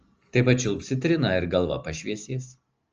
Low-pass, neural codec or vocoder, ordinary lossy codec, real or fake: 7.2 kHz; none; Opus, 24 kbps; real